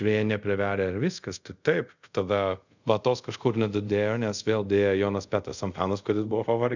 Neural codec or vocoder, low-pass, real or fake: codec, 24 kHz, 0.5 kbps, DualCodec; 7.2 kHz; fake